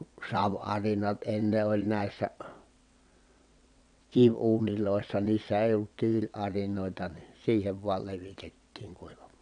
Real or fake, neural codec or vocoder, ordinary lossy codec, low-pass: fake; vocoder, 22.05 kHz, 80 mel bands, WaveNeXt; none; 9.9 kHz